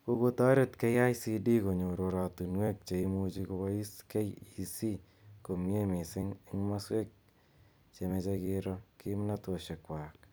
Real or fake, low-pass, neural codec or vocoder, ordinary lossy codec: fake; none; vocoder, 44.1 kHz, 128 mel bands every 256 samples, BigVGAN v2; none